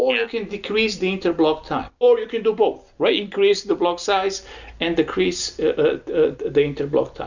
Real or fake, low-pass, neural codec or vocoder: real; 7.2 kHz; none